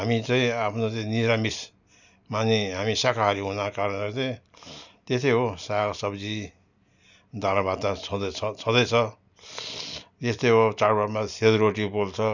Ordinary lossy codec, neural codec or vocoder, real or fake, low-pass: none; none; real; 7.2 kHz